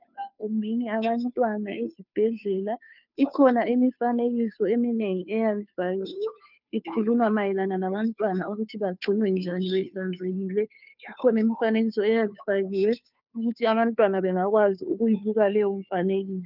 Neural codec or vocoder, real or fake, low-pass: codec, 16 kHz, 2 kbps, FunCodec, trained on Chinese and English, 25 frames a second; fake; 5.4 kHz